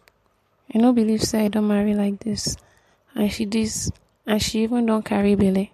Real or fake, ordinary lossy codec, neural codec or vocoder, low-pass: real; MP3, 64 kbps; none; 14.4 kHz